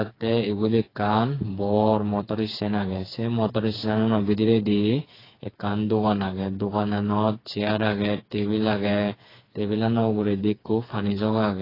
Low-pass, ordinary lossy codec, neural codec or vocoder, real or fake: 5.4 kHz; AAC, 24 kbps; codec, 16 kHz, 4 kbps, FreqCodec, smaller model; fake